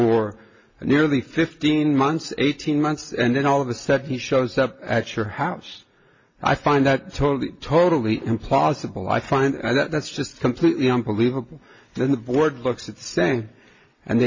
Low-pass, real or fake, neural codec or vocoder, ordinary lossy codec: 7.2 kHz; real; none; MP3, 32 kbps